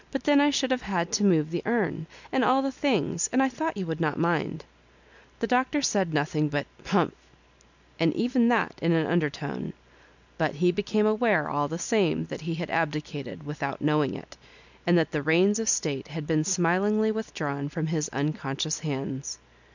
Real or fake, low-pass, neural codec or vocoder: real; 7.2 kHz; none